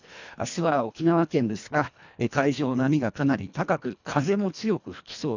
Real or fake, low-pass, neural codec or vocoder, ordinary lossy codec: fake; 7.2 kHz; codec, 24 kHz, 1.5 kbps, HILCodec; none